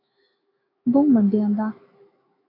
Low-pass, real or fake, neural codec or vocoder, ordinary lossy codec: 5.4 kHz; fake; autoencoder, 48 kHz, 128 numbers a frame, DAC-VAE, trained on Japanese speech; AAC, 24 kbps